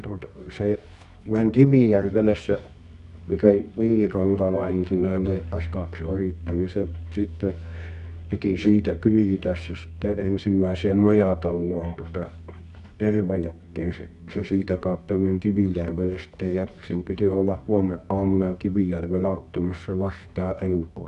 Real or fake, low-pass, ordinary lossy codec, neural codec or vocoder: fake; 10.8 kHz; none; codec, 24 kHz, 0.9 kbps, WavTokenizer, medium music audio release